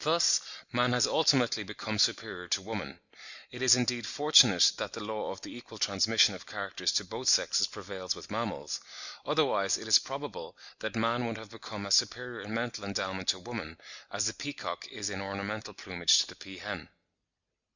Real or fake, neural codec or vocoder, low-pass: real; none; 7.2 kHz